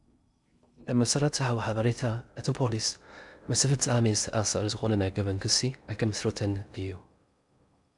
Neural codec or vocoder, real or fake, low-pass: codec, 16 kHz in and 24 kHz out, 0.6 kbps, FocalCodec, streaming, 2048 codes; fake; 10.8 kHz